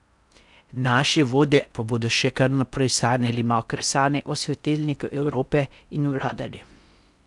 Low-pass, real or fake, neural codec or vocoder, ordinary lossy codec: 10.8 kHz; fake; codec, 16 kHz in and 24 kHz out, 0.6 kbps, FocalCodec, streaming, 4096 codes; none